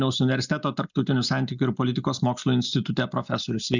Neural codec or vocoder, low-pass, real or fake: none; 7.2 kHz; real